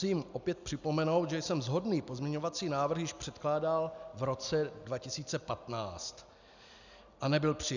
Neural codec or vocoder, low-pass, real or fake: none; 7.2 kHz; real